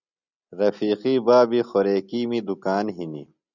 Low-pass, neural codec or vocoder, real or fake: 7.2 kHz; none; real